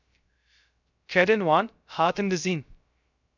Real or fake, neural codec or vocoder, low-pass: fake; codec, 16 kHz, 0.3 kbps, FocalCodec; 7.2 kHz